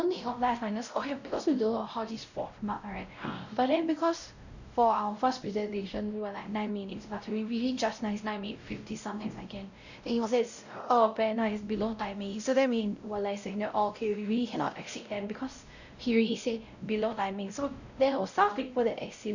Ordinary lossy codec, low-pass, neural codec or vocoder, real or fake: none; 7.2 kHz; codec, 16 kHz, 0.5 kbps, X-Codec, WavLM features, trained on Multilingual LibriSpeech; fake